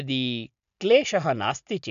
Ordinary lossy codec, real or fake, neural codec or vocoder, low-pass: MP3, 96 kbps; real; none; 7.2 kHz